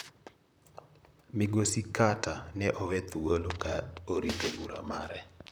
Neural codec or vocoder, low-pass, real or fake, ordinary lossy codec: vocoder, 44.1 kHz, 128 mel bands, Pupu-Vocoder; none; fake; none